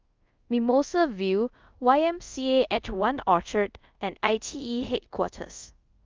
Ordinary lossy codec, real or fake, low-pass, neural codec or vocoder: Opus, 24 kbps; fake; 7.2 kHz; codec, 24 kHz, 0.5 kbps, DualCodec